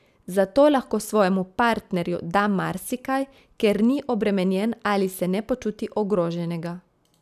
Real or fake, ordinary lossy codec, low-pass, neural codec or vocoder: real; none; 14.4 kHz; none